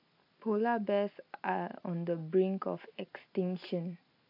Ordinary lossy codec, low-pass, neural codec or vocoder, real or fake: AAC, 32 kbps; 5.4 kHz; none; real